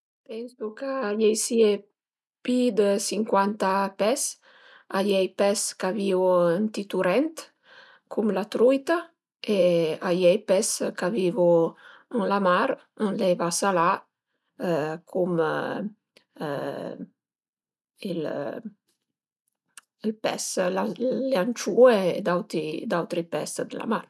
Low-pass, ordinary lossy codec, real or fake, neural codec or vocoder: none; none; real; none